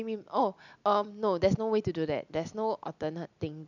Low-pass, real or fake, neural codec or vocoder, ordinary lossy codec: 7.2 kHz; real; none; none